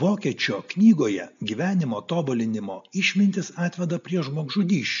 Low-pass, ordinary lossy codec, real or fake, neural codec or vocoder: 7.2 kHz; AAC, 96 kbps; real; none